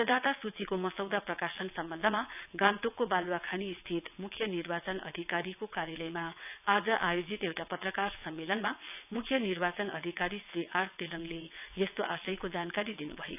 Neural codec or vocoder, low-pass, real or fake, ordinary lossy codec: vocoder, 22.05 kHz, 80 mel bands, WaveNeXt; 3.6 kHz; fake; none